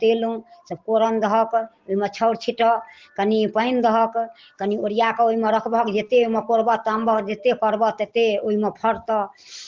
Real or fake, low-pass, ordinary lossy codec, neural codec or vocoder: real; 7.2 kHz; Opus, 16 kbps; none